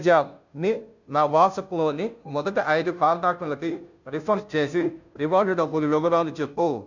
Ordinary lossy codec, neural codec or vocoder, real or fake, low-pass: none; codec, 16 kHz, 0.5 kbps, FunCodec, trained on Chinese and English, 25 frames a second; fake; 7.2 kHz